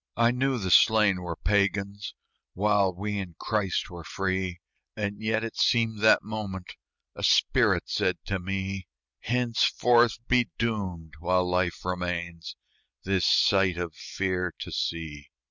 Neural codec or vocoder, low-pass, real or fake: none; 7.2 kHz; real